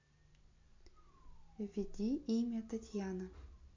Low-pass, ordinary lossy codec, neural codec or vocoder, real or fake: 7.2 kHz; MP3, 48 kbps; none; real